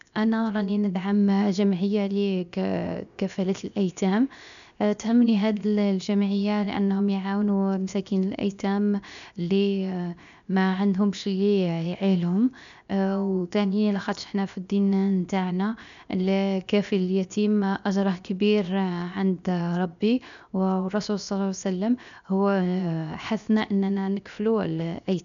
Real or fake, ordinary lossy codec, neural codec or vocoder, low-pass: fake; none; codec, 16 kHz, 0.7 kbps, FocalCodec; 7.2 kHz